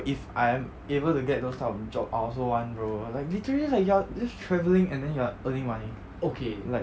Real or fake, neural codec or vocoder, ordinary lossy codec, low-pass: real; none; none; none